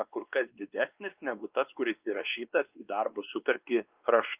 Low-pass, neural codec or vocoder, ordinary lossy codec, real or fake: 3.6 kHz; codec, 16 kHz, 2 kbps, X-Codec, WavLM features, trained on Multilingual LibriSpeech; Opus, 24 kbps; fake